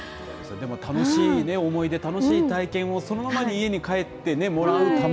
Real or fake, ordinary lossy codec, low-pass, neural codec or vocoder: real; none; none; none